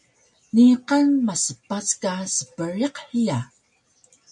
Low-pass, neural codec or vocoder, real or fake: 10.8 kHz; none; real